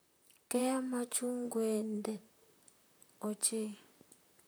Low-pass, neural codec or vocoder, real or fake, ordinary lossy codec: none; vocoder, 44.1 kHz, 128 mel bands, Pupu-Vocoder; fake; none